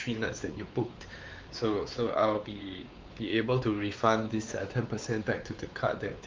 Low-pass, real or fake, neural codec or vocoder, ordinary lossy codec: 7.2 kHz; fake; codec, 16 kHz, 4 kbps, X-Codec, WavLM features, trained on Multilingual LibriSpeech; Opus, 24 kbps